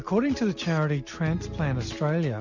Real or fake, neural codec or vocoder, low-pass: real; none; 7.2 kHz